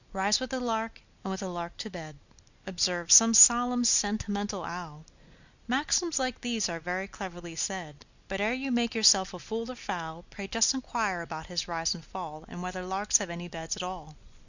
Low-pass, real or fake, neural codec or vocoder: 7.2 kHz; real; none